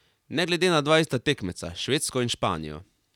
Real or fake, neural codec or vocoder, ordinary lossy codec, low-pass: real; none; none; 19.8 kHz